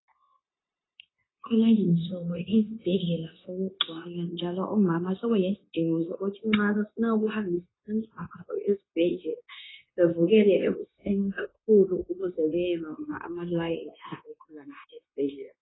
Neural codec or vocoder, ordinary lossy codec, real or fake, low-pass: codec, 16 kHz, 0.9 kbps, LongCat-Audio-Codec; AAC, 16 kbps; fake; 7.2 kHz